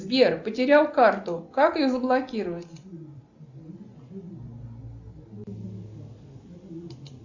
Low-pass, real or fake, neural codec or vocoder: 7.2 kHz; real; none